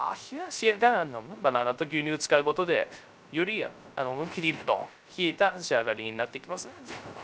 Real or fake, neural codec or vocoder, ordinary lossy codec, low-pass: fake; codec, 16 kHz, 0.3 kbps, FocalCodec; none; none